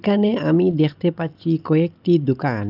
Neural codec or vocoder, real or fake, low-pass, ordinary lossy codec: none; real; 5.4 kHz; Opus, 24 kbps